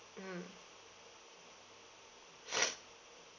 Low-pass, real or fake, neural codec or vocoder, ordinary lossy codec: 7.2 kHz; real; none; none